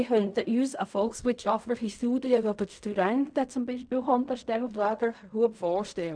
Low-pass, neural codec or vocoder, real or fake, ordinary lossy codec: 9.9 kHz; codec, 16 kHz in and 24 kHz out, 0.4 kbps, LongCat-Audio-Codec, fine tuned four codebook decoder; fake; none